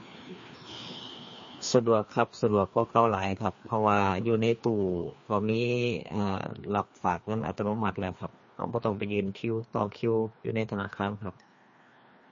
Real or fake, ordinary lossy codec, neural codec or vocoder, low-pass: fake; MP3, 32 kbps; codec, 16 kHz, 2 kbps, FreqCodec, larger model; 7.2 kHz